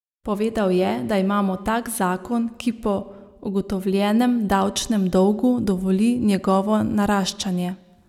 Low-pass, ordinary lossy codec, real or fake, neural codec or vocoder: 19.8 kHz; none; real; none